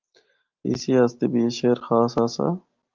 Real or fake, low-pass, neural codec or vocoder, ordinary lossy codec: real; 7.2 kHz; none; Opus, 24 kbps